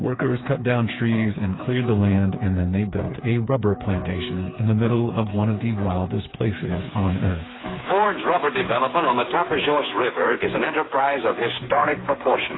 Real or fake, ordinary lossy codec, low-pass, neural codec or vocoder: fake; AAC, 16 kbps; 7.2 kHz; codec, 16 kHz, 4 kbps, FreqCodec, smaller model